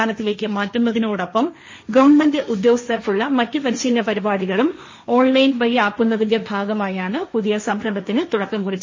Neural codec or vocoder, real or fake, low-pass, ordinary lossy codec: codec, 16 kHz, 1.1 kbps, Voila-Tokenizer; fake; 7.2 kHz; MP3, 32 kbps